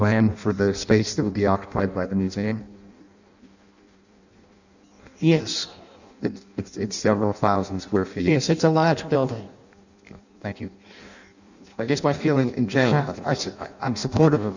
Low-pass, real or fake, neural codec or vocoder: 7.2 kHz; fake; codec, 16 kHz in and 24 kHz out, 0.6 kbps, FireRedTTS-2 codec